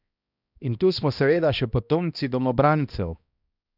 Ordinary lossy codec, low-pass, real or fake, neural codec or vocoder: none; 5.4 kHz; fake; codec, 16 kHz, 1 kbps, X-Codec, HuBERT features, trained on balanced general audio